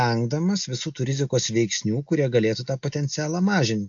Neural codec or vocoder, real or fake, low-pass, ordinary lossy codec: none; real; 7.2 kHz; AAC, 64 kbps